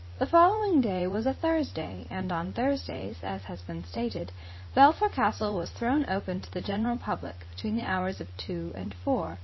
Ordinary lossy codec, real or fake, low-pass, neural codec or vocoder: MP3, 24 kbps; fake; 7.2 kHz; vocoder, 44.1 kHz, 80 mel bands, Vocos